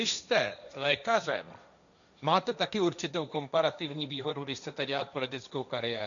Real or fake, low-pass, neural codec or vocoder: fake; 7.2 kHz; codec, 16 kHz, 1.1 kbps, Voila-Tokenizer